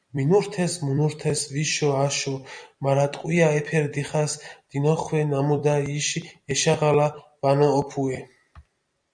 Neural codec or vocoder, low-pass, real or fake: vocoder, 44.1 kHz, 128 mel bands every 256 samples, BigVGAN v2; 9.9 kHz; fake